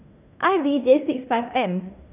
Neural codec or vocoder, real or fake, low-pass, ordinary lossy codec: codec, 16 kHz in and 24 kHz out, 0.9 kbps, LongCat-Audio-Codec, fine tuned four codebook decoder; fake; 3.6 kHz; none